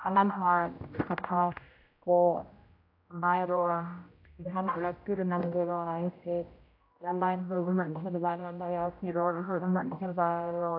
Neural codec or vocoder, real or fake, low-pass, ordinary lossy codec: codec, 16 kHz, 0.5 kbps, X-Codec, HuBERT features, trained on general audio; fake; 5.4 kHz; AAC, 32 kbps